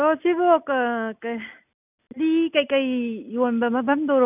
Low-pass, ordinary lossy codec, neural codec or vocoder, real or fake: 3.6 kHz; none; none; real